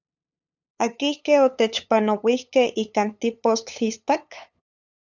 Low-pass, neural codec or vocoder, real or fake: 7.2 kHz; codec, 16 kHz, 8 kbps, FunCodec, trained on LibriTTS, 25 frames a second; fake